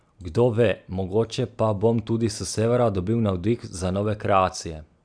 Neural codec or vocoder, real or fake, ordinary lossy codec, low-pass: none; real; none; 9.9 kHz